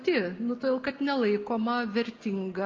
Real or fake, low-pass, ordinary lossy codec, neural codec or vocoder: real; 7.2 kHz; Opus, 24 kbps; none